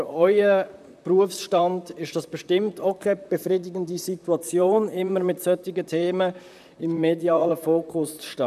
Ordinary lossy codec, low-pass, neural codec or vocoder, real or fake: none; 14.4 kHz; vocoder, 44.1 kHz, 128 mel bands, Pupu-Vocoder; fake